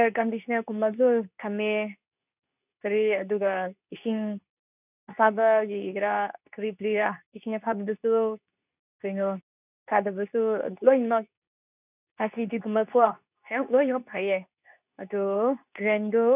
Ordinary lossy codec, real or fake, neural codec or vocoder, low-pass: none; fake; codec, 24 kHz, 0.9 kbps, WavTokenizer, medium speech release version 2; 3.6 kHz